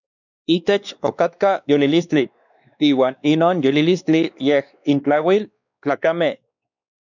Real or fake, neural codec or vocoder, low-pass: fake; codec, 16 kHz, 2 kbps, X-Codec, WavLM features, trained on Multilingual LibriSpeech; 7.2 kHz